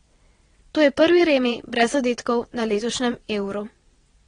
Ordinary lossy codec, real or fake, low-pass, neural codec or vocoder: AAC, 32 kbps; fake; 9.9 kHz; vocoder, 22.05 kHz, 80 mel bands, WaveNeXt